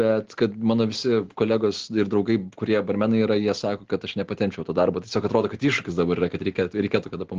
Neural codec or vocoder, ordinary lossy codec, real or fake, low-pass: none; Opus, 16 kbps; real; 7.2 kHz